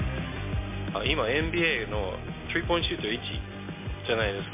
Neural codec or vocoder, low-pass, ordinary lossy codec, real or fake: none; 3.6 kHz; MP3, 24 kbps; real